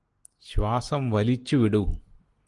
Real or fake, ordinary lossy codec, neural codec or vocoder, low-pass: real; Opus, 24 kbps; none; 10.8 kHz